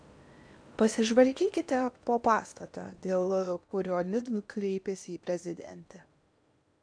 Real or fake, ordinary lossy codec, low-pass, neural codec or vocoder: fake; MP3, 96 kbps; 9.9 kHz; codec, 16 kHz in and 24 kHz out, 0.8 kbps, FocalCodec, streaming, 65536 codes